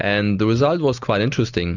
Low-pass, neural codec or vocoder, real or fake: 7.2 kHz; none; real